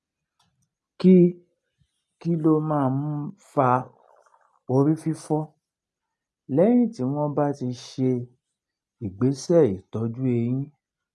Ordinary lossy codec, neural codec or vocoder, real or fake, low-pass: none; none; real; none